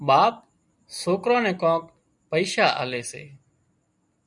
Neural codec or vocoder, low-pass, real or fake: none; 10.8 kHz; real